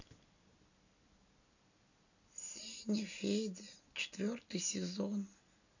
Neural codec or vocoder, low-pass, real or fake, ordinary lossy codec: none; 7.2 kHz; real; none